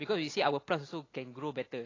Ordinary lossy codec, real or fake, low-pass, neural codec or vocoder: AAC, 32 kbps; real; 7.2 kHz; none